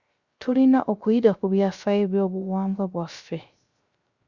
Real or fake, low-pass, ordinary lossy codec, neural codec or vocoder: fake; 7.2 kHz; Opus, 64 kbps; codec, 16 kHz, 0.3 kbps, FocalCodec